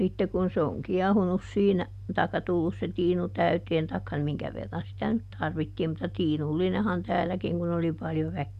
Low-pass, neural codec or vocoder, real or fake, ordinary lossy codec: 14.4 kHz; none; real; none